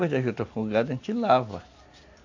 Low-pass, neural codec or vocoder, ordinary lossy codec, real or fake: 7.2 kHz; none; AAC, 48 kbps; real